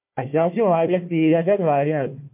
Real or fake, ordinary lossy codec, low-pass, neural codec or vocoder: fake; MP3, 32 kbps; 3.6 kHz; codec, 16 kHz, 1 kbps, FunCodec, trained on Chinese and English, 50 frames a second